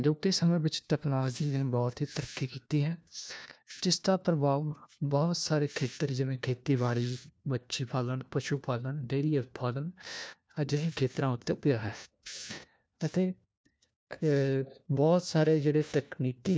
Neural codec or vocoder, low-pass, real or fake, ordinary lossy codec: codec, 16 kHz, 1 kbps, FunCodec, trained on LibriTTS, 50 frames a second; none; fake; none